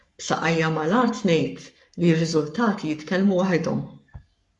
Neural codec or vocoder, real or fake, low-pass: codec, 44.1 kHz, 7.8 kbps, Pupu-Codec; fake; 10.8 kHz